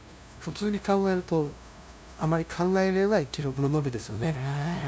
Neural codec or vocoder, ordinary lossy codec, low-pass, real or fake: codec, 16 kHz, 0.5 kbps, FunCodec, trained on LibriTTS, 25 frames a second; none; none; fake